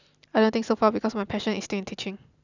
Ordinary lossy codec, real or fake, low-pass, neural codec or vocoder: none; real; 7.2 kHz; none